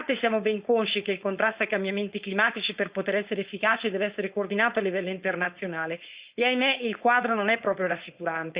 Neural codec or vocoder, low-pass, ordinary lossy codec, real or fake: codec, 16 kHz, 4.8 kbps, FACodec; 3.6 kHz; Opus, 64 kbps; fake